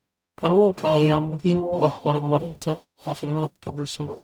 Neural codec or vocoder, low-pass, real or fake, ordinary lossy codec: codec, 44.1 kHz, 0.9 kbps, DAC; none; fake; none